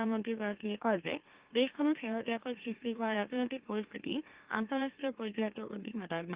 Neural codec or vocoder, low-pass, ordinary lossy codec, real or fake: autoencoder, 44.1 kHz, a latent of 192 numbers a frame, MeloTTS; 3.6 kHz; Opus, 32 kbps; fake